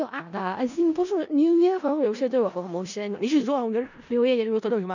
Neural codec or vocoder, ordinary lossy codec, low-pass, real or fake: codec, 16 kHz in and 24 kHz out, 0.4 kbps, LongCat-Audio-Codec, four codebook decoder; none; 7.2 kHz; fake